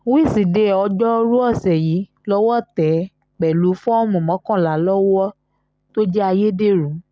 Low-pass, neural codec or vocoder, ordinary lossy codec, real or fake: none; none; none; real